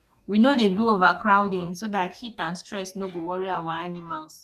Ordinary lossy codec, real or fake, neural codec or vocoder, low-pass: none; fake; codec, 44.1 kHz, 2.6 kbps, DAC; 14.4 kHz